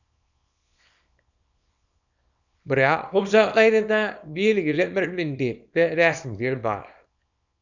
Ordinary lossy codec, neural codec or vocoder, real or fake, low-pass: none; codec, 24 kHz, 0.9 kbps, WavTokenizer, small release; fake; 7.2 kHz